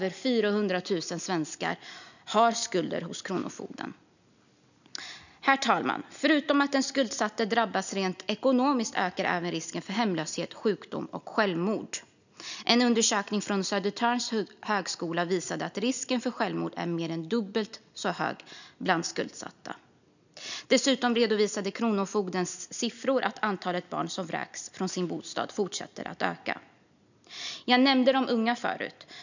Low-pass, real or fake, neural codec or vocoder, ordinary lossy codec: 7.2 kHz; real; none; none